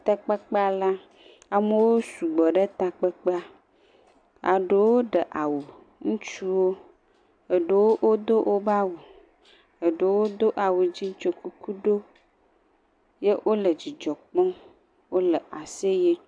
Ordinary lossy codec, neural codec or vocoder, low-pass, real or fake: AAC, 64 kbps; none; 9.9 kHz; real